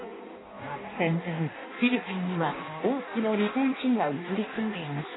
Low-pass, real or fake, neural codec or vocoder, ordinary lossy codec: 7.2 kHz; fake; codec, 16 kHz in and 24 kHz out, 0.6 kbps, FireRedTTS-2 codec; AAC, 16 kbps